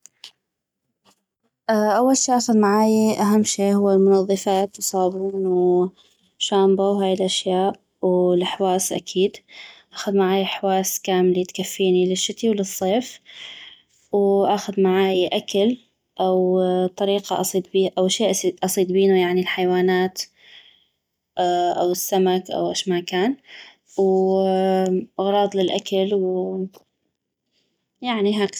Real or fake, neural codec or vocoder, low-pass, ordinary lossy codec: real; none; 19.8 kHz; none